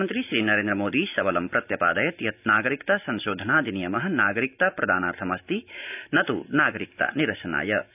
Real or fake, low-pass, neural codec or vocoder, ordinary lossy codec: real; 3.6 kHz; none; none